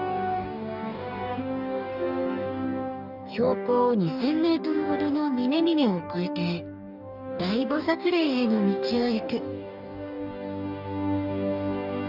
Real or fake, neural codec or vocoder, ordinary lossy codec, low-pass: fake; codec, 44.1 kHz, 2.6 kbps, DAC; none; 5.4 kHz